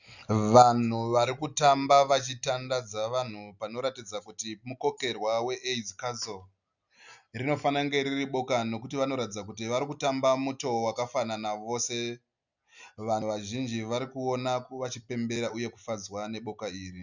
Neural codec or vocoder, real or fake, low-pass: none; real; 7.2 kHz